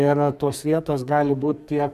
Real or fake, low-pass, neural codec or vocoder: fake; 14.4 kHz; codec, 44.1 kHz, 2.6 kbps, SNAC